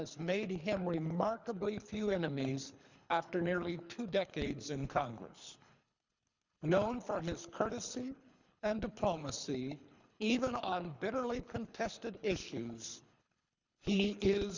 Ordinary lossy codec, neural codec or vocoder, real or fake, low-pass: Opus, 64 kbps; codec, 24 kHz, 3 kbps, HILCodec; fake; 7.2 kHz